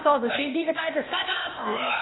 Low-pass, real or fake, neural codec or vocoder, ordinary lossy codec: 7.2 kHz; fake; codec, 16 kHz, 0.8 kbps, ZipCodec; AAC, 16 kbps